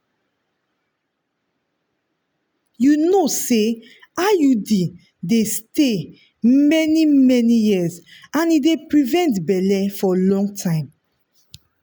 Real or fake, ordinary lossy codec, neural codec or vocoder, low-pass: real; none; none; none